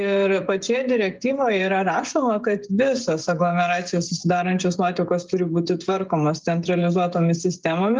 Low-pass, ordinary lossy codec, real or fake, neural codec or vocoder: 7.2 kHz; Opus, 32 kbps; fake; codec, 16 kHz, 16 kbps, FreqCodec, smaller model